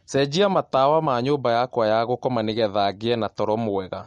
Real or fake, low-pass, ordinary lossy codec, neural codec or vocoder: real; 19.8 kHz; MP3, 48 kbps; none